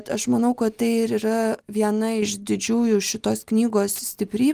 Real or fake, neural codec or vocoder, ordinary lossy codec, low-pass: real; none; Opus, 32 kbps; 14.4 kHz